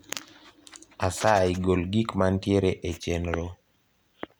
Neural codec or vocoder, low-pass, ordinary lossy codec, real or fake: none; none; none; real